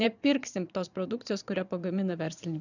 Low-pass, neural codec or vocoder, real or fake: 7.2 kHz; vocoder, 22.05 kHz, 80 mel bands, Vocos; fake